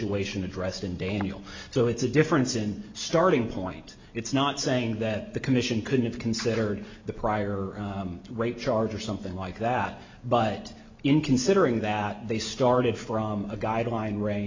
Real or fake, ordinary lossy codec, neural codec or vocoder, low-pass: real; MP3, 64 kbps; none; 7.2 kHz